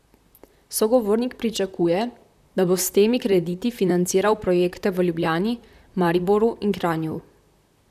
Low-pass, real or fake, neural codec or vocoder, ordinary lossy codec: 14.4 kHz; fake; vocoder, 44.1 kHz, 128 mel bands, Pupu-Vocoder; none